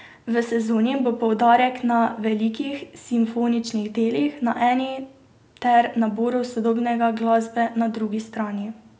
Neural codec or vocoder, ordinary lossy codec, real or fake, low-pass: none; none; real; none